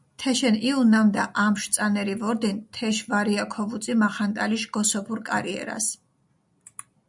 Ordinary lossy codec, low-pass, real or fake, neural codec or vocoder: MP3, 96 kbps; 10.8 kHz; real; none